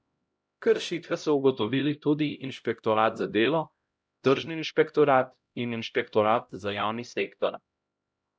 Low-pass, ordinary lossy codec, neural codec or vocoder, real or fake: none; none; codec, 16 kHz, 0.5 kbps, X-Codec, HuBERT features, trained on LibriSpeech; fake